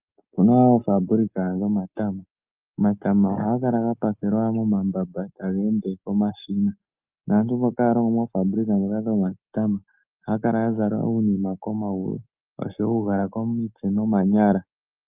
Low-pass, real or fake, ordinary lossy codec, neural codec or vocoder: 3.6 kHz; real; Opus, 24 kbps; none